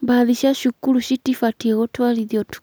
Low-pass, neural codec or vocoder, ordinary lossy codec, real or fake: none; none; none; real